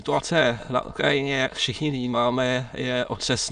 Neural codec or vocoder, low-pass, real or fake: autoencoder, 22.05 kHz, a latent of 192 numbers a frame, VITS, trained on many speakers; 9.9 kHz; fake